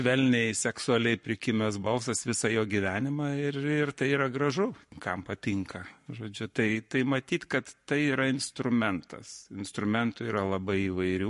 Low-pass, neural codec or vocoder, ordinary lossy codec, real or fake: 14.4 kHz; codec, 44.1 kHz, 7.8 kbps, Pupu-Codec; MP3, 48 kbps; fake